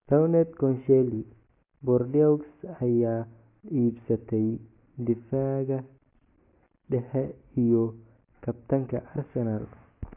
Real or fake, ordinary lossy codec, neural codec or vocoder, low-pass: real; AAC, 24 kbps; none; 3.6 kHz